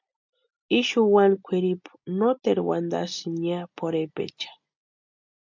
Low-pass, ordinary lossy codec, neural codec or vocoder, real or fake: 7.2 kHz; MP3, 64 kbps; none; real